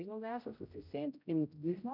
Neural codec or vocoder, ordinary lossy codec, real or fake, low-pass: codec, 16 kHz, 0.5 kbps, X-Codec, HuBERT features, trained on general audio; none; fake; 5.4 kHz